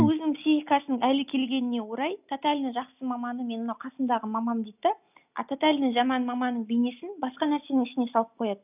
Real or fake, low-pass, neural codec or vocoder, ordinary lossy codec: real; 3.6 kHz; none; none